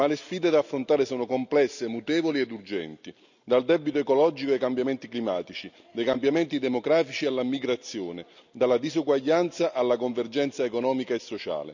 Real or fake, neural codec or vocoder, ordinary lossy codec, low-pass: real; none; none; 7.2 kHz